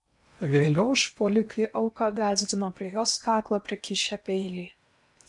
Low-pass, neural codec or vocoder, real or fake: 10.8 kHz; codec, 16 kHz in and 24 kHz out, 0.8 kbps, FocalCodec, streaming, 65536 codes; fake